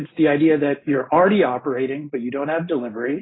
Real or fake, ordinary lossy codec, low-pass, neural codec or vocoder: real; AAC, 16 kbps; 7.2 kHz; none